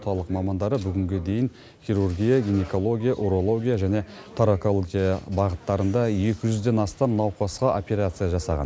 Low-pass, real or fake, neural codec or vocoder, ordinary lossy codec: none; real; none; none